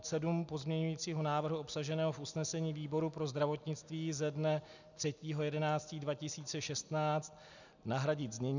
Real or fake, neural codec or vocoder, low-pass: real; none; 7.2 kHz